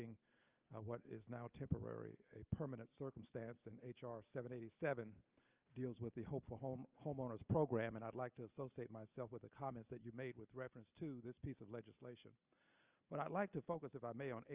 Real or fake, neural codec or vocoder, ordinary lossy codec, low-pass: real; none; Opus, 24 kbps; 3.6 kHz